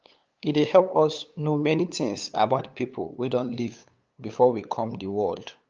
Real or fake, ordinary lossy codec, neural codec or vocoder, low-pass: fake; Opus, 24 kbps; codec, 16 kHz, 8 kbps, FunCodec, trained on LibriTTS, 25 frames a second; 7.2 kHz